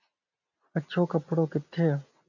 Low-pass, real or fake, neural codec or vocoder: 7.2 kHz; real; none